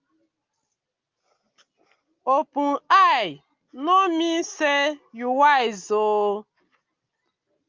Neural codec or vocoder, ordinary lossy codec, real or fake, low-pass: none; Opus, 24 kbps; real; 7.2 kHz